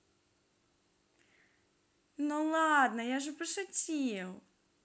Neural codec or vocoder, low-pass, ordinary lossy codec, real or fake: none; none; none; real